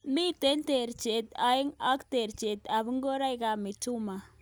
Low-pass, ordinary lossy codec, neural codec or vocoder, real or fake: none; none; none; real